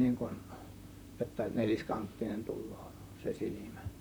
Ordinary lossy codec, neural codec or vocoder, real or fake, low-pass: none; vocoder, 44.1 kHz, 128 mel bands, Pupu-Vocoder; fake; none